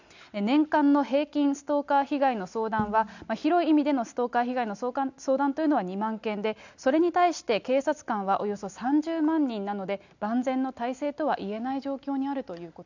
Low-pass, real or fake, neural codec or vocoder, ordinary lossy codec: 7.2 kHz; real; none; none